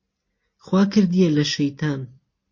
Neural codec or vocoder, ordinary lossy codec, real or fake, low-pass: none; MP3, 32 kbps; real; 7.2 kHz